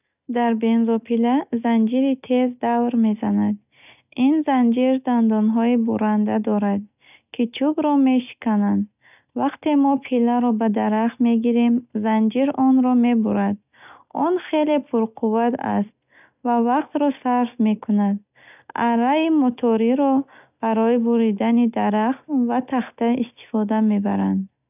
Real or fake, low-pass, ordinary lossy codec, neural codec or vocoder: real; 3.6 kHz; none; none